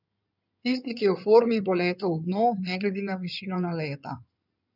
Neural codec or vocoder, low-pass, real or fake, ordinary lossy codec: codec, 16 kHz in and 24 kHz out, 2.2 kbps, FireRedTTS-2 codec; 5.4 kHz; fake; none